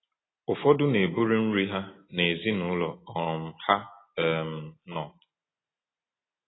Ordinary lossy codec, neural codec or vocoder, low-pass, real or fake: AAC, 16 kbps; none; 7.2 kHz; real